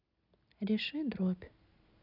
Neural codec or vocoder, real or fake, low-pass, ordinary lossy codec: none; real; 5.4 kHz; none